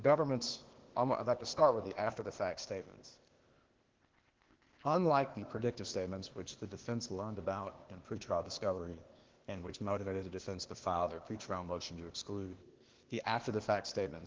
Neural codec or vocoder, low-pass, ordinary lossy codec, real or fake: codec, 16 kHz, 0.8 kbps, ZipCodec; 7.2 kHz; Opus, 16 kbps; fake